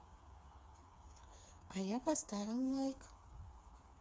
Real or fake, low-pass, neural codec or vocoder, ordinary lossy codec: fake; none; codec, 16 kHz, 4 kbps, FreqCodec, smaller model; none